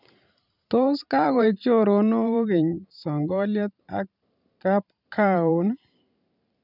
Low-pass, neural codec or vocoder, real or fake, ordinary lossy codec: 5.4 kHz; vocoder, 44.1 kHz, 128 mel bands every 512 samples, BigVGAN v2; fake; none